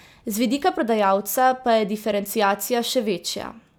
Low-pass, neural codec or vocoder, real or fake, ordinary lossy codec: none; none; real; none